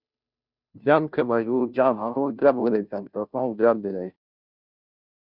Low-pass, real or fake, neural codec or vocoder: 5.4 kHz; fake; codec, 16 kHz, 0.5 kbps, FunCodec, trained on Chinese and English, 25 frames a second